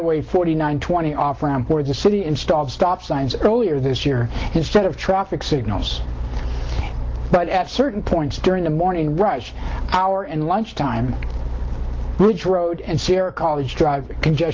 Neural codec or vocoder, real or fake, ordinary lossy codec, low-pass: none; real; Opus, 16 kbps; 7.2 kHz